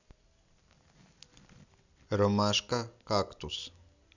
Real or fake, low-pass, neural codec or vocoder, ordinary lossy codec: real; 7.2 kHz; none; none